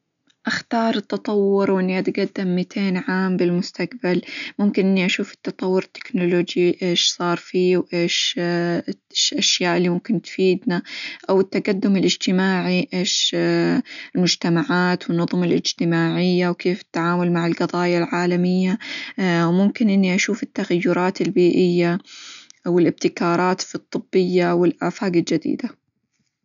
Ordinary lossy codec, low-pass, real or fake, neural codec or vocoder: none; 7.2 kHz; real; none